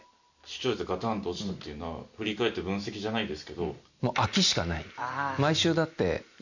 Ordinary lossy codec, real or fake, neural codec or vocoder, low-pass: none; real; none; 7.2 kHz